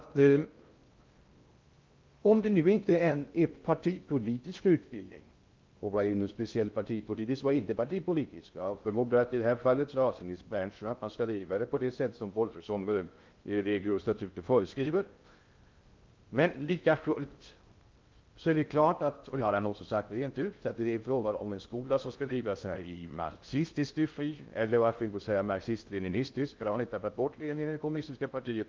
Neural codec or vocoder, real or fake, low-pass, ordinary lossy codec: codec, 16 kHz in and 24 kHz out, 0.6 kbps, FocalCodec, streaming, 2048 codes; fake; 7.2 kHz; Opus, 24 kbps